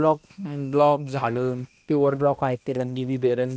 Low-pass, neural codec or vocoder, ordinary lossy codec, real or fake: none; codec, 16 kHz, 1 kbps, X-Codec, HuBERT features, trained on balanced general audio; none; fake